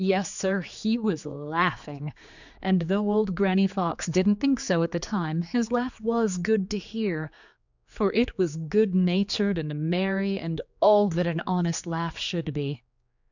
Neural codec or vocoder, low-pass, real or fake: codec, 16 kHz, 4 kbps, X-Codec, HuBERT features, trained on general audio; 7.2 kHz; fake